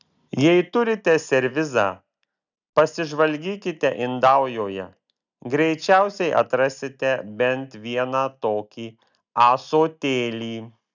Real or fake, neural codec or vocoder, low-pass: real; none; 7.2 kHz